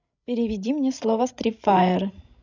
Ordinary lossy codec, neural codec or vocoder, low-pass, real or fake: none; codec, 16 kHz, 16 kbps, FreqCodec, larger model; 7.2 kHz; fake